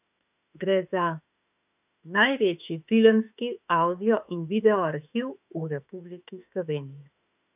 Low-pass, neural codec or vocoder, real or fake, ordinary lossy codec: 3.6 kHz; autoencoder, 48 kHz, 32 numbers a frame, DAC-VAE, trained on Japanese speech; fake; none